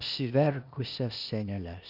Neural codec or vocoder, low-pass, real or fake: codec, 16 kHz, 0.8 kbps, ZipCodec; 5.4 kHz; fake